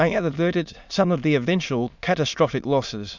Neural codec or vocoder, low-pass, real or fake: autoencoder, 22.05 kHz, a latent of 192 numbers a frame, VITS, trained on many speakers; 7.2 kHz; fake